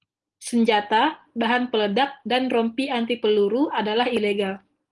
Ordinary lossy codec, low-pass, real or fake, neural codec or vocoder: Opus, 24 kbps; 10.8 kHz; real; none